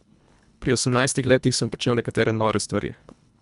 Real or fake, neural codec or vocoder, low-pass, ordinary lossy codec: fake; codec, 24 kHz, 1.5 kbps, HILCodec; 10.8 kHz; none